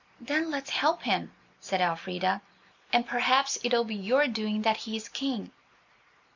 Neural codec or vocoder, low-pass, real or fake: none; 7.2 kHz; real